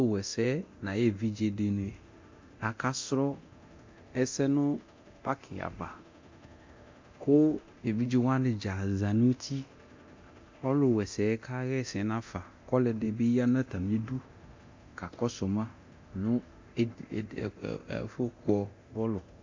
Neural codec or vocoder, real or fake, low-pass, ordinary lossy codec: codec, 24 kHz, 0.9 kbps, DualCodec; fake; 7.2 kHz; MP3, 48 kbps